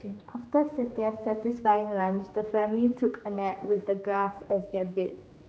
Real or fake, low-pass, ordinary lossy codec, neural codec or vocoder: fake; none; none; codec, 16 kHz, 2 kbps, X-Codec, HuBERT features, trained on general audio